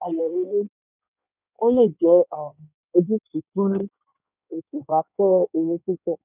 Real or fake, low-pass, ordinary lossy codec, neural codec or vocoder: fake; 3.6 kHz; none; codec, 16 kHz in and 24 kHz out, 1.1 kbps, FireRedTTS-2 codec